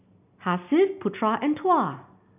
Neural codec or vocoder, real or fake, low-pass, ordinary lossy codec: none; real; 3.6 kHz; none